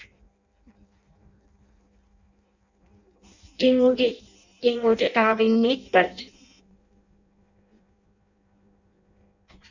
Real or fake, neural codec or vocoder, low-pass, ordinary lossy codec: fake; codec, 16 kHz in and 24 kHz out, 0.6 kbps, FireRedTTS-2 codec; 7.2 kHz; Opus, 64 kbps